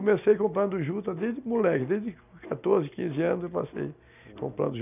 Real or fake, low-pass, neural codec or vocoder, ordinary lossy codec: real; 3.6 kHz; none; none